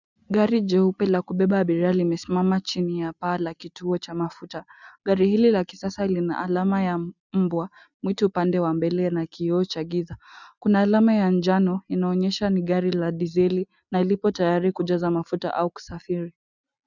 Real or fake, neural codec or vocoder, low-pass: real; none; 7.2 kHz